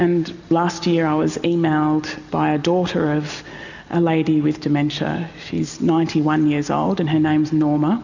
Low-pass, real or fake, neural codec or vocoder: 7.2 kHz; real; none